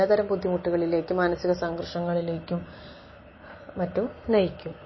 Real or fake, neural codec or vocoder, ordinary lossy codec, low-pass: real; none; MP3, 24 kbps; 7.2 kHz